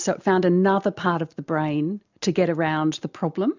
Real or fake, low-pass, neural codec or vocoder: real; 7.2 kHz; none